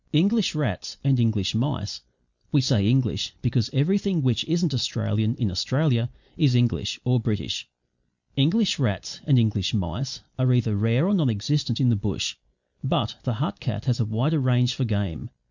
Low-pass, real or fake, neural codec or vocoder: 7.2 kHz; real; none